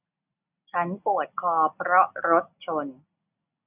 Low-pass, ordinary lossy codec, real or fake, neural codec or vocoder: 3.6 kHz; none; real; none